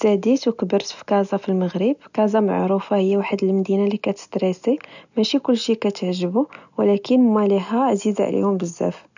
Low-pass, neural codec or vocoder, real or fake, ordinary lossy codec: 7.2 kHz; none; real; none